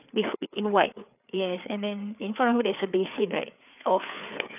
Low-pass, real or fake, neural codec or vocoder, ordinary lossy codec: 3.6 kHz; fake; codec, 16 kHz, 4 kbps, FreqCodec, larger model; none